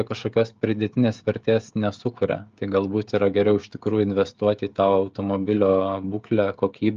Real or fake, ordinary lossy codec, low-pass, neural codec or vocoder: fake; Opus, 32 kbps; 7.2 kHz; codec, 16 kHz, 16 kbps, FreqCodec, smaller model